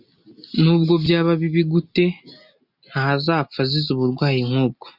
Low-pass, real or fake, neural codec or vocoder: 5.4 kHz; real; none